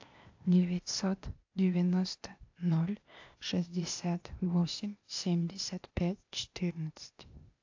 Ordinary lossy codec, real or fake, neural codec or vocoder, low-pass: AAC, 48 kbps; fake; codec, 16 kHz, 0.8 kbps, ZipCodec; 7.2 kHz